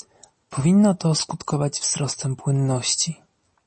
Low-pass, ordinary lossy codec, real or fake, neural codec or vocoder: 10.8 kHz; MP3, 32 kbps; real; none